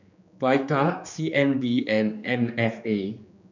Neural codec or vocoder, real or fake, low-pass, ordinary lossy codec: codec, 16 kHz, 2 kbps, X-Codec, HuBERT features, trained on general audio; fake; 7.2 kHz; none